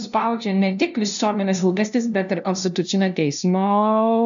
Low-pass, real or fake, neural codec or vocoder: 7.2 kHz; fake; codec, 16 kHz, 0.5 kbps, FunCodec, trained on LibriTTS, 25 frames a second